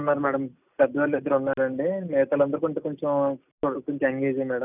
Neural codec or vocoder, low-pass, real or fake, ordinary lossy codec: none; 3.6 kHz; real; none